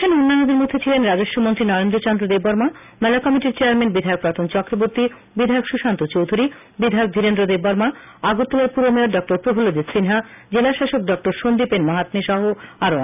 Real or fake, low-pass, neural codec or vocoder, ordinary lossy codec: real; 3.6 kHz; none; none